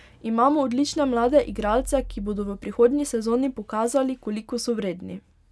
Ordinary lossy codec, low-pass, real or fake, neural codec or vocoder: none; none; real; none